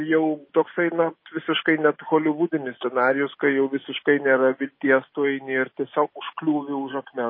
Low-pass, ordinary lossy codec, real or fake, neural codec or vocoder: 5.4 kHz; MP3, 24 kbps; real; none